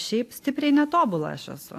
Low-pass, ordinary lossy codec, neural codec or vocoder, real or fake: 14.4 kHz; AAC, 64 kbps; none; real